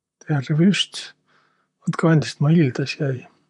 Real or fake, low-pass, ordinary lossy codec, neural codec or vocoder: fake; 10.8 kHz; none; vocoder, 44.1 kHz, 128 mel bands, Pupu-Vocoder